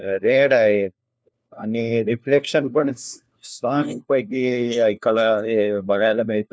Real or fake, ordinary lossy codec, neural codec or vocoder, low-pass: fake; none; codec, 16 kHz, 1 kbps, FunCodec, trained on LibriTTS, 50 frames a second; none